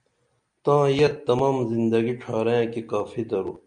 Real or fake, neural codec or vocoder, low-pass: real; none; 9.9 kHz